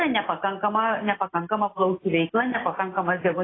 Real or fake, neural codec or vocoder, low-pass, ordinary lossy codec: real; none; 7.2 kHz; AAC, 16 kbps